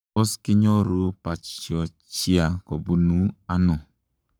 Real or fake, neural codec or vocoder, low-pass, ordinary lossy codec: fake; codec, 44.1 kHz, 7.8 kbps, Pupu-Codec; none; none